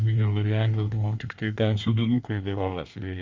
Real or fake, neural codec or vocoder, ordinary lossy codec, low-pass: fake; codec, 24 kHz, 1 kbps, SNAC; Opus, 32 kbps; 7.2 kHz